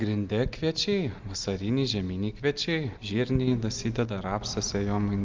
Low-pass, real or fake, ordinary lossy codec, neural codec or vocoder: 7.2 kHz; real; Opus, 32 kbps; none